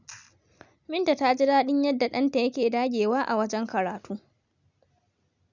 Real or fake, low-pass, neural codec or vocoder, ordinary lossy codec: real; 7.2 kHz; none; none